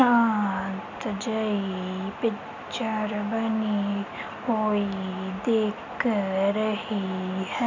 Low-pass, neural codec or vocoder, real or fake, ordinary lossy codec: 7.2 kHz; none; real; none